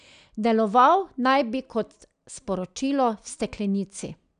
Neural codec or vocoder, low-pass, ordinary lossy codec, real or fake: none; 9.9 kHz; none; real